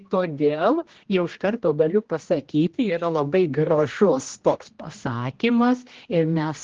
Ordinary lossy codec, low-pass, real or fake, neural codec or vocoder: Opus, 16 kbps; 7.2 kHz; fake; codec, 16 kHz, 1 kbps, X-Codec, HuBERT features, trained on general audio